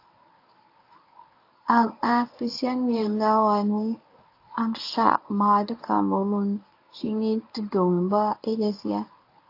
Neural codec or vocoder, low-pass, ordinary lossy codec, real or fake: codec, 24 kHz, 0.9 kbps, WavTokenizer, medium speech release version 1; 5.4 kHz; AAC, 32 kbps; fake